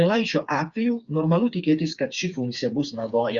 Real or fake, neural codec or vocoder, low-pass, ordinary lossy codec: fake; codec, 16 kHz, 4 kbps, FreqCodec, smaller model; 7.2 kHz; Opus, 64 kbps